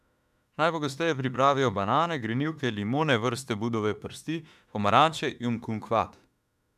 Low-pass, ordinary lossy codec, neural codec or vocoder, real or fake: 14.4 kHz; none; autoencoder, 48 kHz, 32 numbers a frame, DAC-VAE, trained on Japanese speech; fake